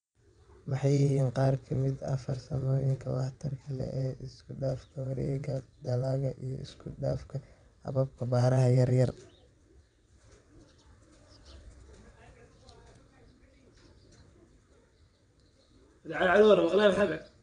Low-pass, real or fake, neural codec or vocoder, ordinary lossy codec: 9.9 kHz; fake; vocoder, 22.05 kHz, 80 mel bands, WaveNeXt; none